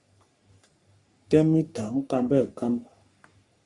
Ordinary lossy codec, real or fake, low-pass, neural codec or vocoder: Opus, 64 kbps; fake; 10.8 kHz; codec, 44.1 kHz, 3.4 kbps, Pupu-Codec